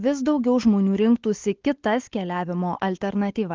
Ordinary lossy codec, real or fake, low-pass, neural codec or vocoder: Opus, 16 kbps; real; 7.2 kHz; none